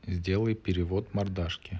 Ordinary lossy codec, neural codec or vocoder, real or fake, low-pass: none; none; real; none